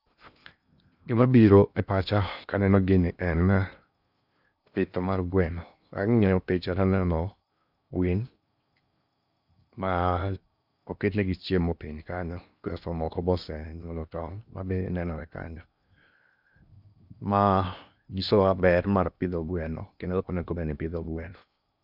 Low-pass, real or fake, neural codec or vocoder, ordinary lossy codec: 5.4 kHz; fake; codec, 16 kHz in and 24 kHz out, 0.8 kbps, FocalCodec, streaming, 65536 codes; none